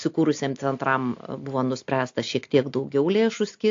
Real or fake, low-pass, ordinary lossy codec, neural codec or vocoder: real; 7.2 kHz; AAC, 48 kbps; none